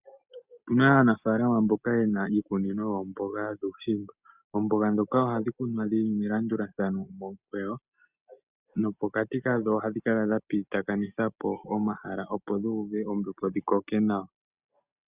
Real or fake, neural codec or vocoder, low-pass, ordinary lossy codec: real; none; 3.6 kHz; Opus, 64 kbps